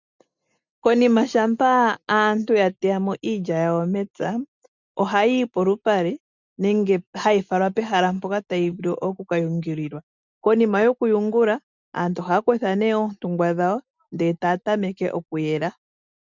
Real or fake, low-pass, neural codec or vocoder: real; 7.2 kHz; none